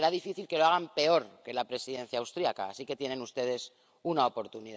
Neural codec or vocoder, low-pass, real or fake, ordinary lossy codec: none; none; real; none